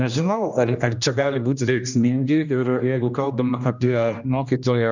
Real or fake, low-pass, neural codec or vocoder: fake; 7.2 kHz; codec, 16 kHz, 1 kbps, X-Codec, HuBERT features, trained on general audio